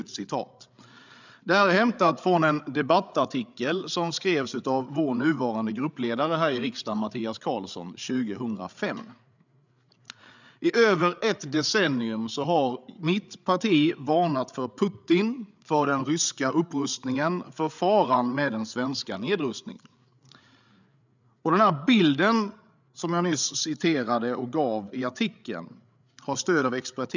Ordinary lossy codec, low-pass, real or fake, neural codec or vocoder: none; 7.2 kHz; fake; codec, 16 kHz, 8 kbps, FreqCodec, larger model